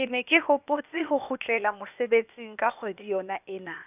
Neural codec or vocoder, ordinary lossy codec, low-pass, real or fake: codec, 16 kHz, 0.8 kbps, ZipCodec; none; 3.6 kHz; fake